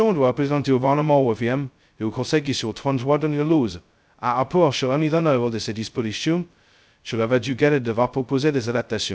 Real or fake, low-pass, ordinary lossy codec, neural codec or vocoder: fake; none; none; codec, 16 kHz, 0.2 kbps, FocalCodec